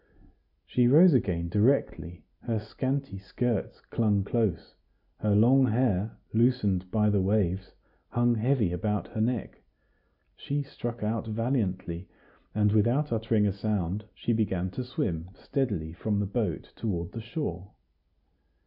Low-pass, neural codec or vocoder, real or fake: 5.4 kHz; none; real